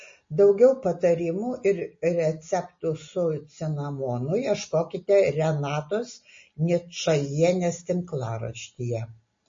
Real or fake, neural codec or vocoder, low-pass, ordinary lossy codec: real; none; 7.2 kHz; MP3, 32 kbps